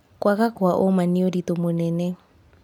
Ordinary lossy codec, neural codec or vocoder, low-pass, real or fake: none; none; 19.8 kHz; real